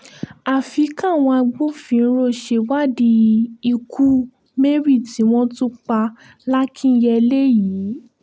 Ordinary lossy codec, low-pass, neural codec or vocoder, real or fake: none; none; none; real